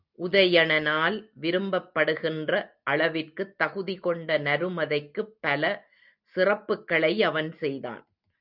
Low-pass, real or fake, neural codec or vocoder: 5.4 kHz; real; none